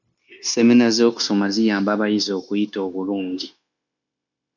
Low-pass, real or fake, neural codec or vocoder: 7.2 kHz; fake; codec, 16 kHz, 0.9 kbps, LongCat-Audio-Codec